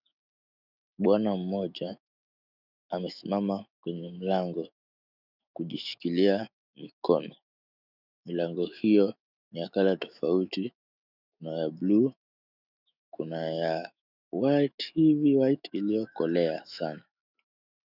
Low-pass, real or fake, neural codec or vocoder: 5.4 kHz; fake; autoencoder, 48 kHz, 128 numbers a frame, DAC-VAE, trained on Japanese speech